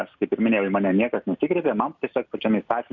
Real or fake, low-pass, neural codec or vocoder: real; 7.2 kHz; none